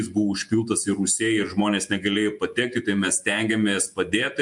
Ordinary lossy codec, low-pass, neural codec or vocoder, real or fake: MP3, 64 kbps; 10.8 kHz; vocoder, 48 kHz, 128 mel bands, Vocos; fake